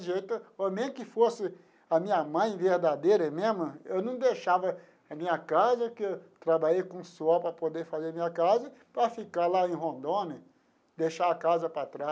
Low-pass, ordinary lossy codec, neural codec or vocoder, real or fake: none; none; none; real